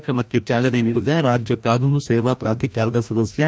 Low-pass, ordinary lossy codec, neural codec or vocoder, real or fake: none; none; codec, 16 kHz, 1 kbps, FreqCodec, larger model; fake